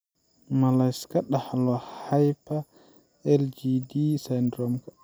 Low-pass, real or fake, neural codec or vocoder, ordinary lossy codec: none; real; none; none